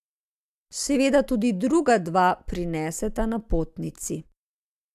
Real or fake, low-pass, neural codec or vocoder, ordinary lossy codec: real; 14.4 kHz; none; AAC, 96 kbps